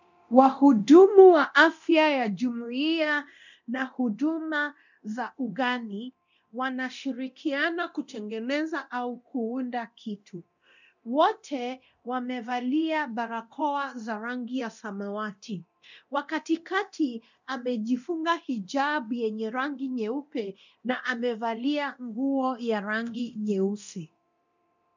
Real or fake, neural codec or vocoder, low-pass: fake; codec, 24 kHz, 0.9 kbps, DualCodec; 7.2 kHz